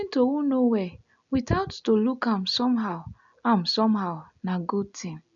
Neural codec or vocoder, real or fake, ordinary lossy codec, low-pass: none; real; none; 7.2 kHz